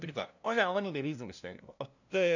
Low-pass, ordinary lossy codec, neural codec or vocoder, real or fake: 7.2 kHz; none; codec, 16 kHz, 0.5 kbps, FunCodec, trained on LibriTTS, 25 frames a second; fake